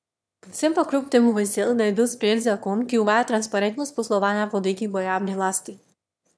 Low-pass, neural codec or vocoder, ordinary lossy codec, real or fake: none; autoencoder, 22.05 kHz, a latent of 192 numbers a frame, VITS, trained on one speaker; none; fake